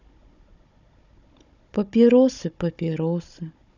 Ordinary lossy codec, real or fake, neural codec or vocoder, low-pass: none; fake; codec, 16 kHz, 16 kbps, FunCodec, trained on Chinese and English, 50 frames a second; 7.2 kHz